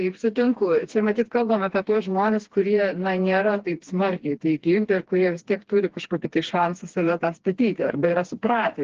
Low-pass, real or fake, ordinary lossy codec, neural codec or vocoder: 7.2 kHz; fake; Opus, 16 kbps; codec, 16 kHz, 2 kbps, FreqCodec, smaller model